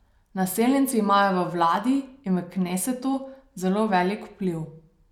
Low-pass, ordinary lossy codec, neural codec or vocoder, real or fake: 19.8 kHz; none; none; real